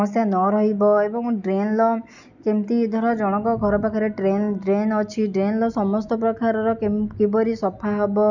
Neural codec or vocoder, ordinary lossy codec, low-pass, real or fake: none; none; 7.2 kHz; real